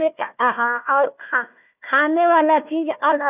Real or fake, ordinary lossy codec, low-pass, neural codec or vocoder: fake; none; 3.6 kHz; codec, 16 kHz, 1 kbps, FunCodec, trained on Chinese and English, 50 frames a second